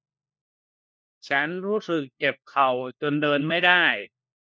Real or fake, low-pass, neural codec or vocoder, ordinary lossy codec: fake; none; codec, 16 kHz, 1 kbps, FunCodec, trained on LibriTTS, 50 frames a second; none